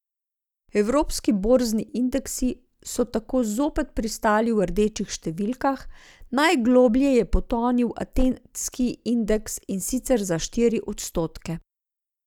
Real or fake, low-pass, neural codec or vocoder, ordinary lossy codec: real; 19.8 kHz; none; none